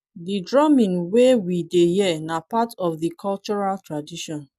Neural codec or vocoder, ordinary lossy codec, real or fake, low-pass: none; none; real; 14.4 kHz